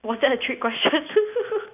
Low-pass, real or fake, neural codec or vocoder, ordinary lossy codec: 3.6 kHz; real; none; none